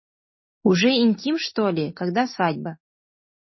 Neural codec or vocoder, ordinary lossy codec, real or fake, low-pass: none; MP3, 24 kbps; real; 7.2 kHz